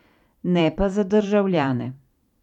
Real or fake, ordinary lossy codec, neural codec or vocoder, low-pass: fake; none; vocoder, 44.1 kHz, 128 mel bands every 512 samples, BigVGAN v2; 19.8 kHz